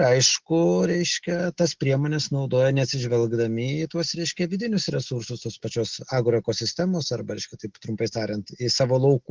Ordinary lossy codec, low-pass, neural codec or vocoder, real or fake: Opus, 16 kbps; 7.2 kHz; none; real